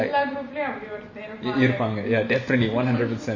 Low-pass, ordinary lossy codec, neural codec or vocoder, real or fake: 7.2 kHz; MP3, 32 kbps; none; real